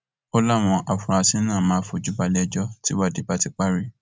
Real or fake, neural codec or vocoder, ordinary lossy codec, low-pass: real; none; none; none